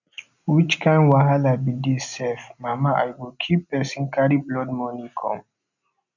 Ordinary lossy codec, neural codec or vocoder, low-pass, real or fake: none; none; 7.2 kHz; real